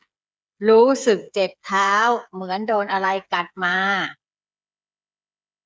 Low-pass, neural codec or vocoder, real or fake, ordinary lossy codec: none; codec, 16 kHz, 8 kbps, FreqCodec, smaller model; fake; none